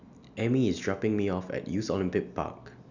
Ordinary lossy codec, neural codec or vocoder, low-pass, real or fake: none; none; 7.2 kHz; real